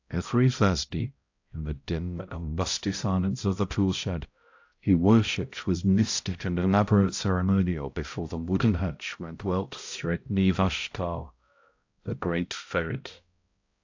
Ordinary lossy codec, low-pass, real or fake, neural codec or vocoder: AAC, 48 kbps; 7.2 kHz; fake; codec, 16 kHz, 0.5 kbps, X-Codec, HuBERT features, trained on balanced general audio